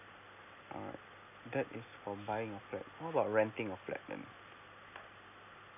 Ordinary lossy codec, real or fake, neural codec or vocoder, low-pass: none; real; none; 3.6 kHz